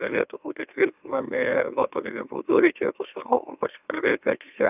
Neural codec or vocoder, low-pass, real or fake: autoencoder, 44.1 kHz, a latent of 192 numbers a frame, MeloTTS; 3.6 kHz; fake